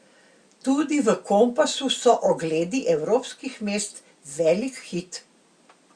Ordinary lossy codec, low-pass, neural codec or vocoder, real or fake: Opus, 64 kbps; 9.9 kHz; none; real